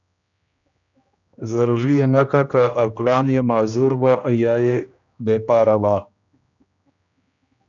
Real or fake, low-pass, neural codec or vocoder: fake; 7.2 kHz; codec, 16 kHz, 1 kbps, X-Codec, HuBERT features, trained on general audio